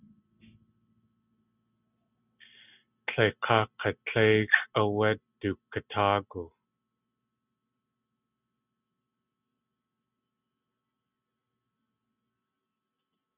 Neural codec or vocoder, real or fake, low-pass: none; real; 3.6 kHz